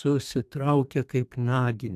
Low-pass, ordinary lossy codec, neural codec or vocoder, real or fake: 14.4 kHz; AAC, 96 kbps; codec, 32 kHz, 1.9 kbps, SNAC; fake